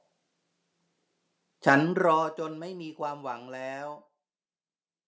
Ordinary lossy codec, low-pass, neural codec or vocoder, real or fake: none; none; none; real